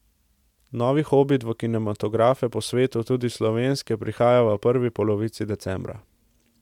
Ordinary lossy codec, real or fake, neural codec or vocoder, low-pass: MP3, 96 kbps; real; none; 19.8 kHz